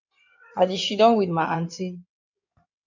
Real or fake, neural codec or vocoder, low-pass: fake; codec, 16 kHz in and 24 kHz out, 2.2 kbps, FireRedTTS-2 codec; 7.2 kHz